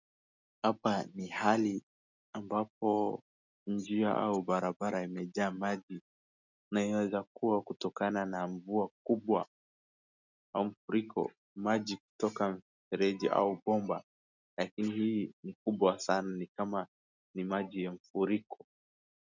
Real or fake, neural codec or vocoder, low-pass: real; none; 7.2 kHz